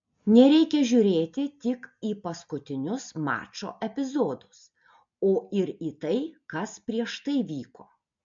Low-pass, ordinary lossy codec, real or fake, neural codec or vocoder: 7.2 kHz; MP3, 48 kbps; real; none